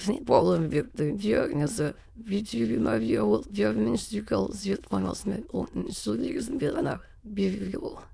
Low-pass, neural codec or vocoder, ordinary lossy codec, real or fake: none; autoencoder, 22.05 kHz, a latent of 192 numbers a frame, VITS, trained on many speakers; none; fake